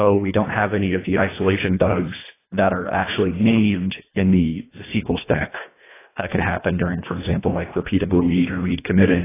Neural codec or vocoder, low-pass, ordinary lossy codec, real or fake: codec, 24 kHz, 1.5 kbps, HILCodec; 3.6 kHz; AAC, 16 kbps; fake